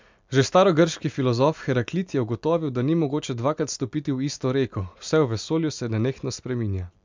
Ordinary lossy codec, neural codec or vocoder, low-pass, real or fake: MP3, 64 kbps; none; 7.2 kHz; real